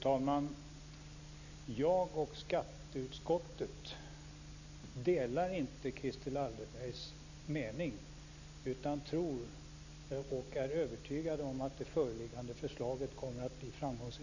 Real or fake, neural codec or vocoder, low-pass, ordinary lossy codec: real; none; 7.2 kHz; none